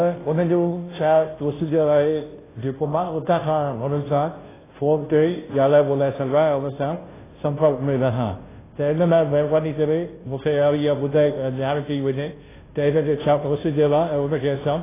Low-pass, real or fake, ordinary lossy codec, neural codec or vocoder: 3.6 kHz; fake; AAC, 16 kbps; codec, 16 kHz, 0.5 kbps, FunCodec, trained on Chinese and English, 25 frames a second